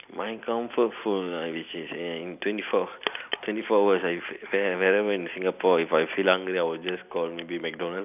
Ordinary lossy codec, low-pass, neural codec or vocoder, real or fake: none; 3.6 kHz; none; real